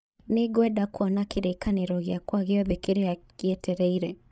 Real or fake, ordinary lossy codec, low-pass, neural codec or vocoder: fake; none; none; codec, 16 kHz, 16 kbps, FreqCodec, larger model